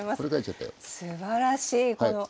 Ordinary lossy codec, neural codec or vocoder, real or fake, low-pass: none; none; real; none